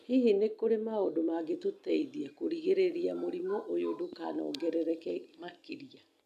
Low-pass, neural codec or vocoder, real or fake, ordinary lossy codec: 14.4 kHz; none; real; none